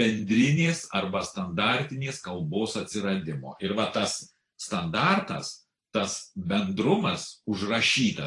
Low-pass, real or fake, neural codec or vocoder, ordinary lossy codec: 10.8 kHz; fake; vocoder, 44.1 kHz, 128 mel bands every 512 samples, BigVGAN v2; AAC, 48 kbps